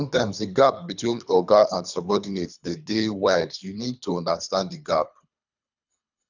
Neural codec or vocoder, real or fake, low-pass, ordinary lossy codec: codec, 24 kHz, 3 kbps, HILCodec; fake; 7.2 kHz; none